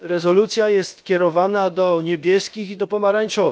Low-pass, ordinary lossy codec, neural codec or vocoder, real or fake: none; none; codec, 16 kHz, about 1 kbps, DyCAST, with the encoder's durations; fake